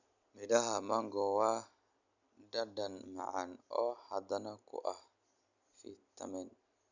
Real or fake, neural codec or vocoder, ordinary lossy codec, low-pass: real; none; none; 7.2 kHz